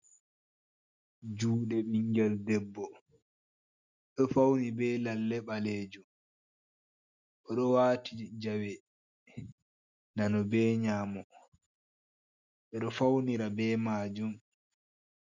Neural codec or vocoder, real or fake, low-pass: none; real; 7.2 kHz